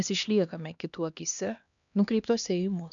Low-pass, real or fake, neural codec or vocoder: 7.2 kHz; fake; codec, 16 kHz, 2 kbps, X-Codec, HuBERT features, trained on LibriSpeech